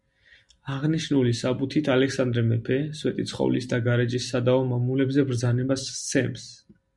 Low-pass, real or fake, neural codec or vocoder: 10.8 kHz; real; none